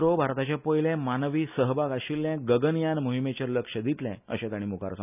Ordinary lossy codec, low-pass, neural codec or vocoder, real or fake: none; 3.6 kHz; none; real